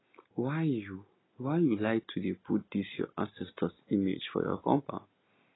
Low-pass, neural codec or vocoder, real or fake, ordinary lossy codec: 7.2 kHz; none; real; AAC, 16 kbps